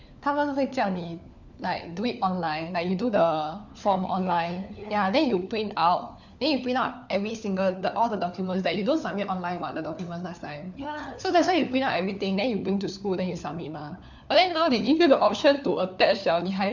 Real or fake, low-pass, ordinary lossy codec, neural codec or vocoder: fake; 7.2 kHz; none; codec, 16 kHz, 4 kbps, FunCodec, trained on LibriTTS, 50 frames a second